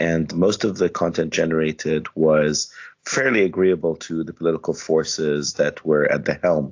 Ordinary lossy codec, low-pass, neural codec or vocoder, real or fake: AAC, 48 kbps; 7.2 kHz; none; real